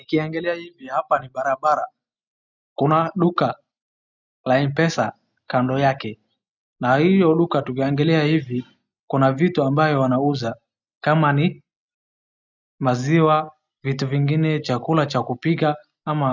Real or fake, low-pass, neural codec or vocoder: real; 7.2 kHz; none